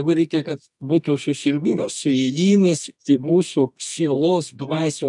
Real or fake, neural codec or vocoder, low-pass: fake; codec, 24 kHz, 0.9 kbps, WavTokenizer, medium music audio release; 10.8 kHz